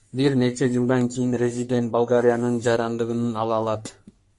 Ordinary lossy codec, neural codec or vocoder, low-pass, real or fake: MP3, 48 kbps; codec, 44.1 kHz, 3.4 kbps, Pupu-Codec; 14.4 kHz; fake